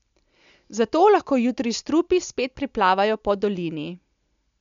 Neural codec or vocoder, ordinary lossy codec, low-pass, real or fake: none; MP3, 64 kbps; 7.2 kHz; real